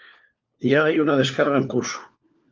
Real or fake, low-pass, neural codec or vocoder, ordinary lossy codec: fake; 7.2 kHz; codec, 16 kHz, 4 kbps, FunCodec, trained on LibriTTS, 50 frames a second; Opus, 24 kbps